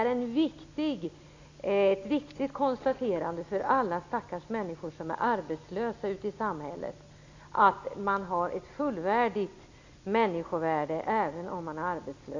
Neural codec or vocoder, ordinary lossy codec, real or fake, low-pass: none; AAC, 48 kbps; real; 7.2 kHz